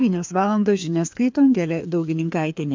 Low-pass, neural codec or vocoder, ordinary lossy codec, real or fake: 7.2 kHz; codec, 16 kHz, 2 kbps, FreqCodec, larger model; MP3, 64 kbps; fake